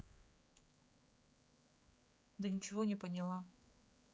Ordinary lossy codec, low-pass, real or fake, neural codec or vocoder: none; none; fake; codec, 16 kHz, 4 kbps, X-Codec, HuBERT features, trained on general audio